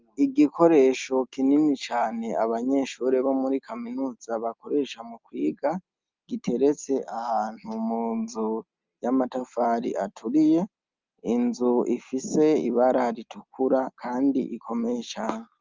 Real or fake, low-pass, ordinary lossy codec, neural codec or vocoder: real; 7.2 kHz; Opus, 24 kbps; none